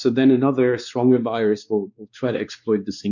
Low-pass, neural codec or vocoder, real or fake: 7.2 kHz; codec, 16 kHz, 2 kbps, X-Codec, WavLM features, trained on Multilingual LibriSpeech; fake